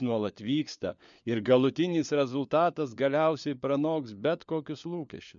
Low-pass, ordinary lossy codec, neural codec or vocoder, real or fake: 7.2 kHz; MP3, 64 kbps; codec, 16 kHz, 4 kbps, FunCodec, trained on LibriTTS, 50 frames a second; fake